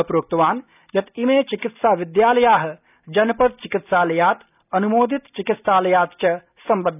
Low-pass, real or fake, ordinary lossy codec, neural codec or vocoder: 3.6 kHz; real; none; none